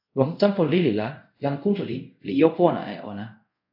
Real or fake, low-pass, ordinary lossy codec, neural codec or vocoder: fake; 5.4 kHz; AAC, 48 kbps; codec, 24 kHz, 0.5 kbps, DualCodec